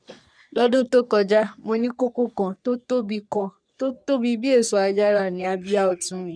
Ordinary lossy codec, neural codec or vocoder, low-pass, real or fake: none; codec, 44.1 kHz, 3.4 kbps, Pupu-Codec; 9.9 kHz; fake